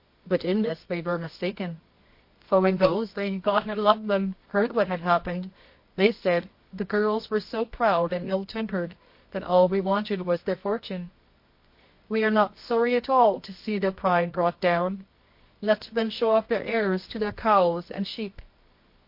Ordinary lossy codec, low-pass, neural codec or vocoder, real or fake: MP3, 32 kbps; 5.4 kHz; codec, 24 kHz, 0.9 kbps, WavTokenizer, medium music audio release; fake